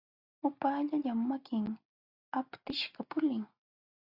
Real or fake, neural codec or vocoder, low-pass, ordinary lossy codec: real; none; 5.4 kHz; Opus, 64 kbps